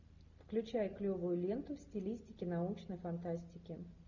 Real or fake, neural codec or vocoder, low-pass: real; none; 7.2 kHz